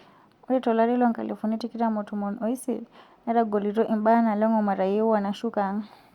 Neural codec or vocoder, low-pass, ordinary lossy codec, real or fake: none; 19.8 kHz; Opus, 64 kbps; real